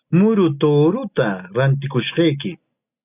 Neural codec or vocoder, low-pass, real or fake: none; 3.6 kHz; real